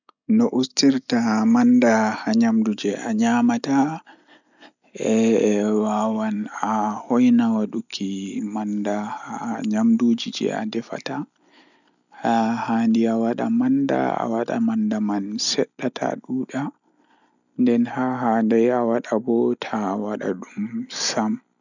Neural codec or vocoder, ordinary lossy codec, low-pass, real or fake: none; none; 7.2 kHz; real